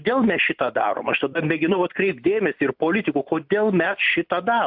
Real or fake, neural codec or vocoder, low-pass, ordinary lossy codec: real; none; 5.4 kHz; AAC, 48 kbps